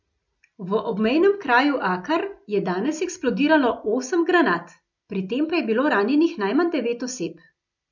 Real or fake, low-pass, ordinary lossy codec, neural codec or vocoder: real; 7.2 kHz; none; none